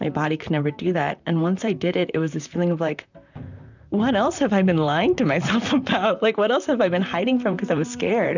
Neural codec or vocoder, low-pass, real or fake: vocoder, 44.1 kHz, 128 mel bands, Pupu-Vocoder; 7.2 kHz; fake